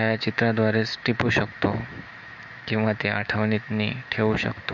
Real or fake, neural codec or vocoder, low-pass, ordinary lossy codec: fake; autoencoder, 48 kHz, 128 numbers a frame, DAC-VAE, trained on Japanese speech; 7.2 kHz; none